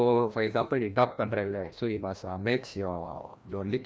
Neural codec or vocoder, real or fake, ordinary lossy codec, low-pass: codec, 16 kHz, 1 kbps, FreqCodec, larger model; fake; none; none